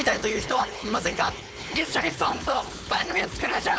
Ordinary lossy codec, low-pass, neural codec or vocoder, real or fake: none; none; codec, 16 kHz, 4.8 kbps, FACodec; fake